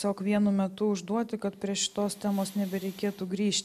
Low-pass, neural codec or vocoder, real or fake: 14.4 kHz; none; real